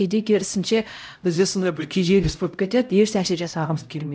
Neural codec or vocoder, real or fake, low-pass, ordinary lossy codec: codec, 16 kHz, 0.5 kbps, X-Codec, HuBERT features, trained on LibriSpeech; fake; none; none